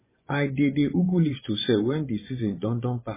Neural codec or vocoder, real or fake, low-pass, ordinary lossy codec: vocoder, 24 kHz, 100 mel bands, Vocos; fake; 3.6 kHz; MP3, 16 kbps